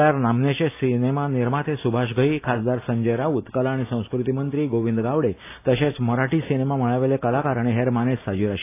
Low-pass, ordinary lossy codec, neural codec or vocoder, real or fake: 3.6 kHz; AAC, 24 kbps; none; real